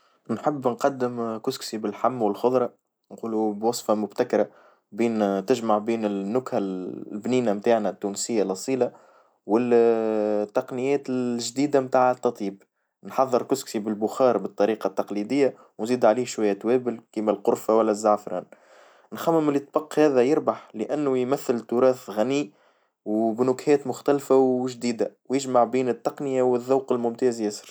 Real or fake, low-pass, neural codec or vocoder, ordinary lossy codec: real; none; none; none